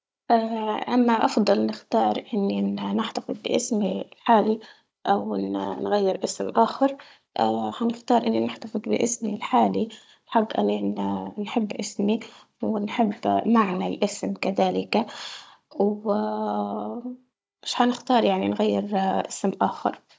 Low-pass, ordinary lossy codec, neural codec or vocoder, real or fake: none; none; codec, 16 kHz, 16 kbps, FunCodec, trained on Chinese and English, 50 frames a second; fake